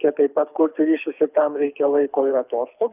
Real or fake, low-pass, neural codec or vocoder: fake; 3.6 kHz; codec, 24 kHz, 6 kbps, HILCodec